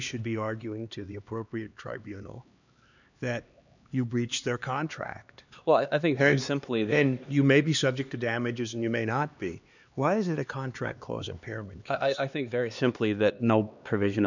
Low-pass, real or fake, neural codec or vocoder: 7.2 kHz; fake; codec, 16 kHz, 2 kbps, X-Codec, HuBERT features, trained on LibriSpeech